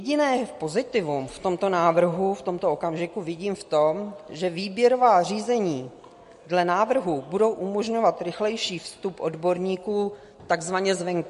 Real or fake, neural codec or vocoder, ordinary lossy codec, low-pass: real; none; MP3, 48 kbps; 14.4 kHz